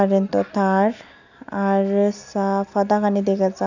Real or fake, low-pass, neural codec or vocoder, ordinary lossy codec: real; 7.2 kHz; none; none